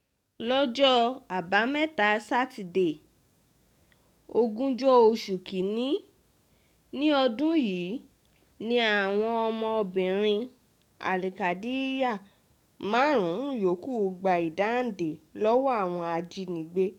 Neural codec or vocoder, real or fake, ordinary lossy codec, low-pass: codec, 44.1 kHz, 7.8 kbps, DAC; fake; none; 19.8 kHz